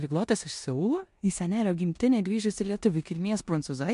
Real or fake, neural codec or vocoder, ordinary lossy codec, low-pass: fake; codec, 16 kHz in and 24 kHz out, 0.9 kbps, LongCat-Audio-Codec, four codebook decoder; MP3, 64 kbps; 10.8 kHz